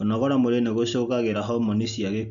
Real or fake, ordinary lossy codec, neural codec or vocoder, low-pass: real; none; none; 7.2 kHz